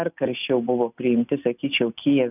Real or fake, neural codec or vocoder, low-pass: real; none; 3.6 kHz